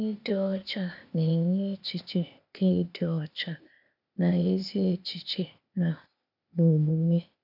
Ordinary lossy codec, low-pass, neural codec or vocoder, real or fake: none; 5.4 kHz; codec, 16 kHz, 0.8 kbps, ZipCodec; fake